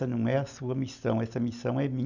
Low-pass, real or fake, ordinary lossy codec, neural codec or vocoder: 7.2 kHz; real; none; none